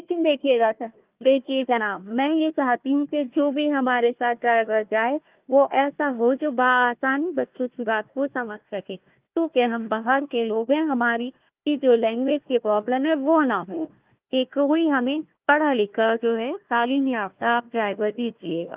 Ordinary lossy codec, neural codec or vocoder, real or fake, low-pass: Opus, 32 kbps; codec, 16 kHz, 1 kbps, FunCodec, trained on Chinese and English, 50 frames a second; fake; 3.6 kHz